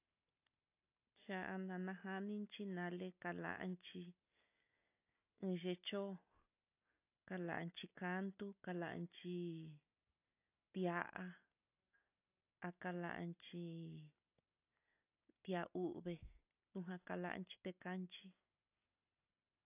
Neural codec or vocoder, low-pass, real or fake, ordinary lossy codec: none; 3.6 kHz; real; AAC, 32 kbps